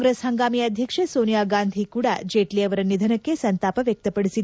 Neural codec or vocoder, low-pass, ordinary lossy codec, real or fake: none; none; none; real